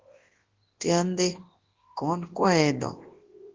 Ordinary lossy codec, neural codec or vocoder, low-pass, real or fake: Opus, 16 kbps; codec, 24 kHz, 0.9 kbps, WavTokenizer, large speech release; 7.2 kHz; fake